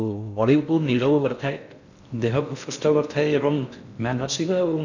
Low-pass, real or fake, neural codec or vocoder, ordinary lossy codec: 7.2 kHz; fake; codec, 16 kHz in and 24 kHz out, 0.6 kbps, FocalCodec, streaming, 4096 codes; none